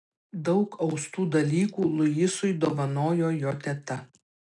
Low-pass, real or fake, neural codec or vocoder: 10.8 kHz; real; none